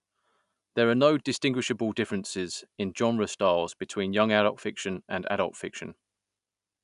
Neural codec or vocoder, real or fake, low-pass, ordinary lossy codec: none; real; 10.8 kHz; none